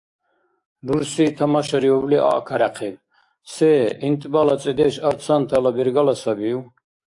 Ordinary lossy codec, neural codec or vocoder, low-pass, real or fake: AAC, 64 kbps; codec, 44.1 kHz, 7.8 kbps, DAC; 10.8 kHz; fake